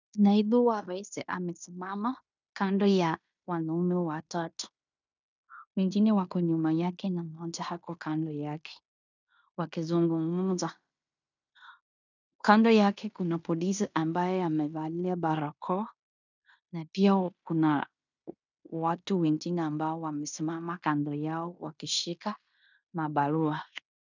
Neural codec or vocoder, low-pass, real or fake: codec, 16 kHz in and 24 kHz out, 0.9 kbps, LongCat-Audio-Codec, fine tuned four codebook decoder; 7.2 kHz; fake